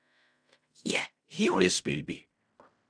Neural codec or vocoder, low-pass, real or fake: codec, 16 kHz in and 24 kHz out, 0.4 kbps, LongCat-Audio-Codec, fine tuned four codebook decoder; 9.9 kHz; fake